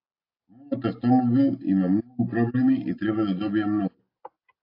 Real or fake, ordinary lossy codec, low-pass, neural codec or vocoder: real; AAC, 32 kbps; 5.4 kHz; none